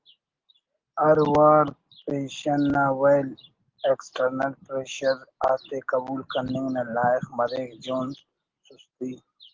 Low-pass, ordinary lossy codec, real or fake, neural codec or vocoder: 7.2 kHz; Opus, 16 kbps; real; none